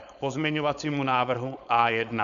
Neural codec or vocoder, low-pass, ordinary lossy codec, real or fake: codec, 16 kHz, 4.8 kbps, FACodec; 7.2 kHz; MP3, 96 kbps; fake